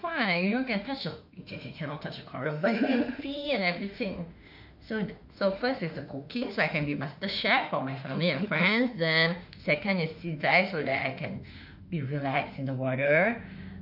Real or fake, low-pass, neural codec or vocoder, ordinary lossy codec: fake; 5.4 kHz; autoencoder, 48 kHz, 32 numbers a frame, DAC-VAE, trained on Japanese speech; none